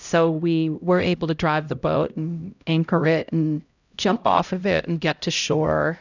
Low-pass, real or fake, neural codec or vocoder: 7.2 kHz; fake; codec, 16 kHz, 0.5 kbps, X-Codec, HuBERT features, trained on LibriSpeech